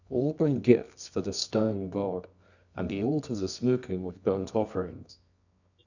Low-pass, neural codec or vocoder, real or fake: 7.2 kHz; codec, 24 kHz, 0.9 kbps, WavTokenizer, medium music audio release; fake